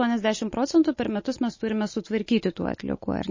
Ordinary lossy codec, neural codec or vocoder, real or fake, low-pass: MP3, 32 kbps; none; real; 7.2 kHz